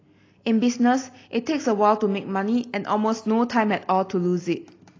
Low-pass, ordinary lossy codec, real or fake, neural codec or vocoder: 7.2 kHz; AAC, 32 kbps; real; none